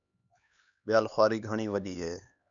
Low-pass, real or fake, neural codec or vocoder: 7.2 kHz; fake; codec, 16 kHz, 2 kbps, X-Codec, HuBERT features, trained on LibriSpeech